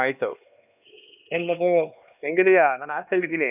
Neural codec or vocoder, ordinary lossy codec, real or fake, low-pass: codec, 16 kHz, 2 kbps, X-Codec, HuBERT features, trained on LibriSpeech; none; fake; 3.6 kHz